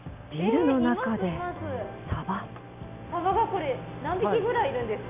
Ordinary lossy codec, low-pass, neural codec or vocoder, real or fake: AAC, 32 kbps; 3.6 kHz; none; real